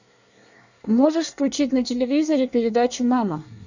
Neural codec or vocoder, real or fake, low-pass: codec, 24 kHz, 1 kbps, SNAC; fake; 7.2 kHz